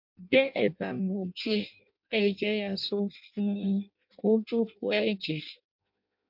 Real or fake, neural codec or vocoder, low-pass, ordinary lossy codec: fake; codec, 16 kHz in and 24 kHz out, 0.6 kbps, FireRedTTS-2 codec; 5.4 kHz; MP3, 48 kbps